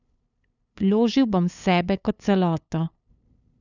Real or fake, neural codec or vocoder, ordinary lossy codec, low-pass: fake; codec, 16 kHz, 8 kbps, FunCodec, trained on LibriTTS, 25 frames a second; none; 7.2 kHz